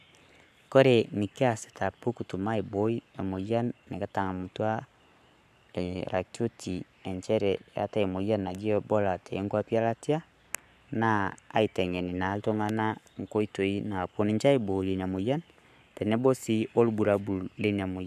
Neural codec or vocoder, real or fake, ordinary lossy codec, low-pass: codec, 44.1 kHz, 7.8 kbps, Pupu-Codec; fake; none; 14.4 kHz